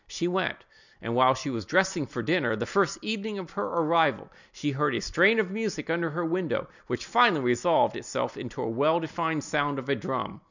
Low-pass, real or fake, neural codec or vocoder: 7.2 kHz; real; none